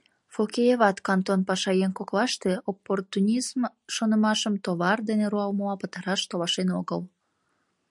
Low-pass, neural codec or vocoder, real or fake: 10.8 kHz; none; real